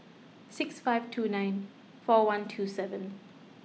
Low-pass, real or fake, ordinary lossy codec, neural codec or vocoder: none; real; none; none